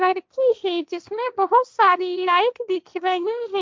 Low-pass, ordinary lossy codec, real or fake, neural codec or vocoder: none; none; fake; codec, 16 kHz, 1.1 kbps, Voila-Tokenizer